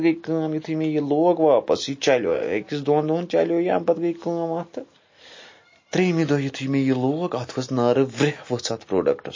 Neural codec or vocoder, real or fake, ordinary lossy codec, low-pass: none; real; MP3, 32 kbps; 7.2 kHz